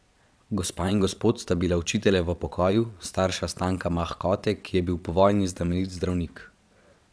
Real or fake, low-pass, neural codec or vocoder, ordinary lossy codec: fake; none; vocoder, 22.05 kHz, 80 mel bands, Vocos; none